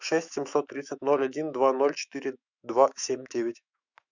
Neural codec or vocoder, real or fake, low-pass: autoencoder, 48 kHz, 128 numbers a frame, DAC-VAE, trained on Japanese speech; fake; 7.2 kHz